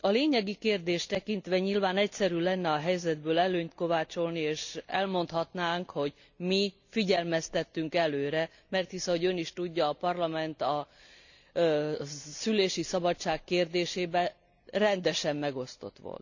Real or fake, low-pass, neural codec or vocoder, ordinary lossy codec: real; 7.2 kHz; none; none